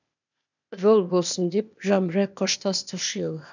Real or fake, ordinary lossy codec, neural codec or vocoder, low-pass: fake; none; codec, 16 kHz, 0.8 kbps, ZipCodec; 7.2 kHz